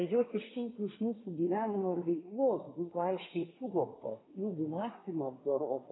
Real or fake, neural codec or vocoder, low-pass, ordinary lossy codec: fake; codec, 24 kHz, 1 kbps, SNAC; 7.2 kHz; AAC, 16 kbps